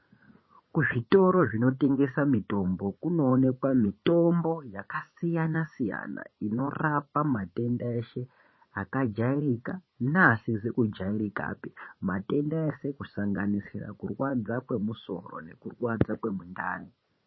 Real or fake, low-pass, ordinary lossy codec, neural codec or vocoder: fake; 7.2 kHz; MP3, 24 kbps; vocoder, 44.1 kHz, 80 mel bands, Vocos